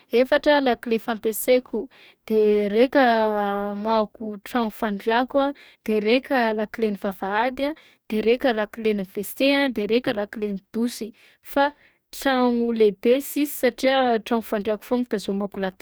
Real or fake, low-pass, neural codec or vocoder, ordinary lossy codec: fake; none; codec, 44.1 kHz, 2.6 kbps, DAC; none